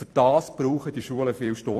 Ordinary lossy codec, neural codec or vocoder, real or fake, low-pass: AAC, 64 kbps; vocoder, 44.1 kHz, 128 mel bands every 256 samples, BigVGAN v2; fake; 14.4 kHz